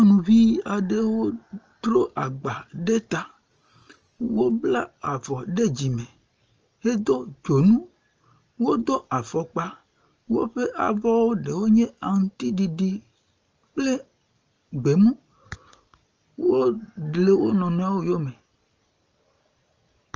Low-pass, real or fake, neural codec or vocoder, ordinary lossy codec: 7.2 kHz; real; none; Opus, 24 kbps